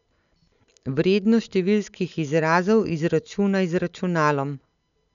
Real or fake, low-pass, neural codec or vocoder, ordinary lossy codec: real; 7.2 kHz; none; none